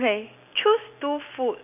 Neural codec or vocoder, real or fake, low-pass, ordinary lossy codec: none; real; 3.6 kHz; none